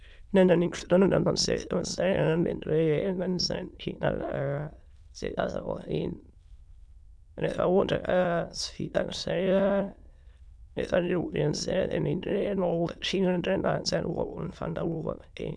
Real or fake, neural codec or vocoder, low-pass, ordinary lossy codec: fake; autoencoder, 22.05 kHz, a latent of 192 numbers a frame, VITS, trained on many speakers; none; none